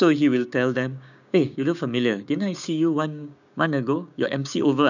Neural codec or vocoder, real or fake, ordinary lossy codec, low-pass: codec, 16 kHz, 6 kbps, DAC; fake; none; 7.2 kHz